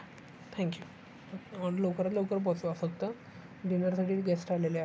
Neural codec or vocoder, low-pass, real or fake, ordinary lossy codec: none; none; real; none